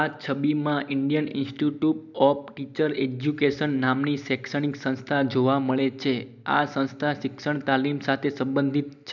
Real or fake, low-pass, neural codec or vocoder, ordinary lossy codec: real; 7.2 kHz; none; none